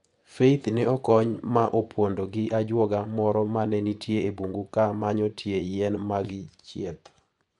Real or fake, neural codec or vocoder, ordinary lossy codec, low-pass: fake; vocoder, 24 kHz, 100 mel bands, Vocos; MP3, 96 kbps; 10.8 kHz